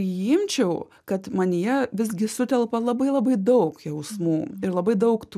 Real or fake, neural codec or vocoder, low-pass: real; none; 14.4 kHz